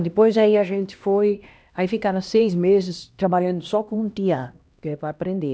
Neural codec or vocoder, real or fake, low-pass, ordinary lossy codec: codec, 16 kHz, 1 kbps, X-Codec, HuBERT features, trained on LibriSpeech; fake; none; none